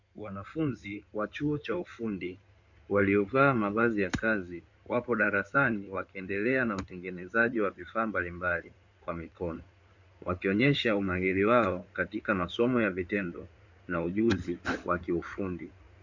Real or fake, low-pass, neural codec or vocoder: fake; 7.2 kHz; codec, 16 kHz in and 24 kHz out, 2.2 kbps, FireRedTTS-2 codec